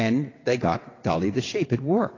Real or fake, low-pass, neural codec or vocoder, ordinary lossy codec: fake; 7.2 kHz; vocoder, 22.05 kHz, 80 mel bands, WaveNeXt; AAC, 32 kbps